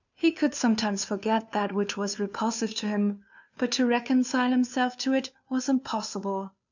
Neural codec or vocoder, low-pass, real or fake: codec, 16 kHz, 2 kbps, FunCodec, trained on Chinese and English, 25 frames a second; 7.2 kHz; fake